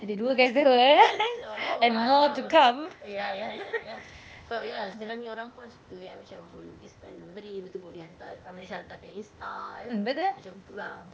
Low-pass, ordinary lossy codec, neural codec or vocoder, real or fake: none; none; codec, 16 kHz, 0.8 kbps, ZipCodec; fake